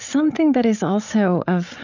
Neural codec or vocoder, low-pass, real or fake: codec, 16 kHz, 16 kbps, FreqCodec, larger model; 7.2 kHz; fake